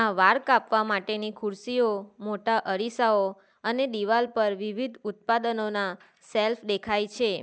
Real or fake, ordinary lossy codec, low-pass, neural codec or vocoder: real; none; none; none